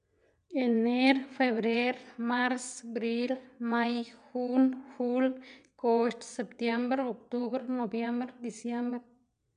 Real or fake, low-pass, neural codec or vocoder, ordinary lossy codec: fake; 9.9 kHz; vocoder, 22.05 kHz, 80 mel bands, WaveNeXt; none